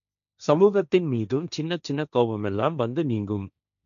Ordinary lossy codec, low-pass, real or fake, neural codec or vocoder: none; 7.2 kHz; fake; codec, 16 kHz, 1.1 kbps, Voila-Tokenizer